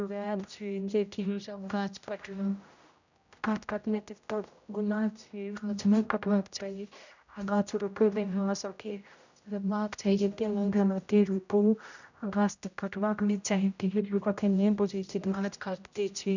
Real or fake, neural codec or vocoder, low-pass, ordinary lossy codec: fake; codec, 16 kHz, 0.5 kbps, X-Codec, HuBERT features, trained on general audio; 7.2 kHz; none